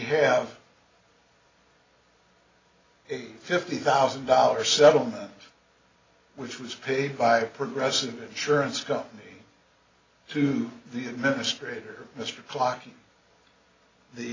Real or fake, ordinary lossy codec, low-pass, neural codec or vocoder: real; AAC, 32 kbps; 7.2 kHz; none